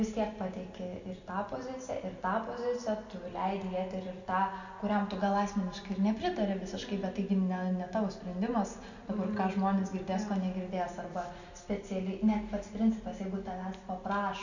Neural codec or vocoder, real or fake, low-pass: none; real; 7.2 kHz